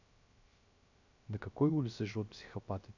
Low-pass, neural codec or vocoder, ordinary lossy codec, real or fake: 7.2 kHz; codec, 16 kHz, 0.3 kbps, FocalCodec; none; fake